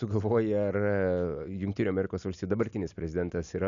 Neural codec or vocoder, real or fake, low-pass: none; real; 7.2 kHz